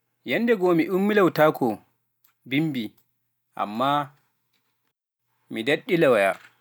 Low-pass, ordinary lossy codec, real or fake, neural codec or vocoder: none; none; real; none